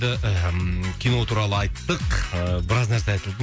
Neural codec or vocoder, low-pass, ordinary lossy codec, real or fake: none; none; none; real